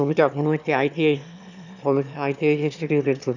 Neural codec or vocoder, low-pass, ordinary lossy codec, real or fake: autoencoder, 22.05 kHz, a latent of 192 numbers a frame, VITS, trained on one speaker; 7.2 kHz; none; fake